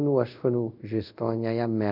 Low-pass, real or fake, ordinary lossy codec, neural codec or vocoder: 5.4 kHz; fake; none; codec, 24 kHz, 0.5 kbps, DualCodec